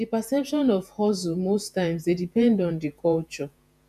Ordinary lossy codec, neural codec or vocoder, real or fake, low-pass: none; vocoder, 48 kHz, 128 mel bands, Vocos; fake; 14.4 kHz